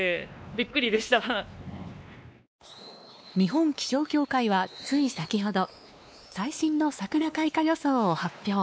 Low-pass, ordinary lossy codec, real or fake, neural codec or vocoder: none; none; fake; codec, 16 kHz, 2 kbps, X-Codec, WavLM features, trained on Multilingual LibriSpeech